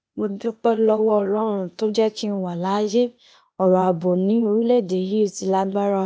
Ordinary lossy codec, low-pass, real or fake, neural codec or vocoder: none; none; fake; codec, 16 kHz, 0.8 kbps, ZipCodec